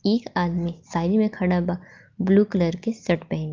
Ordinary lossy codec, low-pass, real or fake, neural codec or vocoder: Opus, 32 kbps; 7.2 kHz; real; none